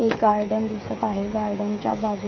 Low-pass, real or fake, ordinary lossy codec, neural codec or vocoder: 7.2 kHz; fake; MP3, 32 kbps; codec, 16 kHz, 8 kbps, FreqCodec, smaller model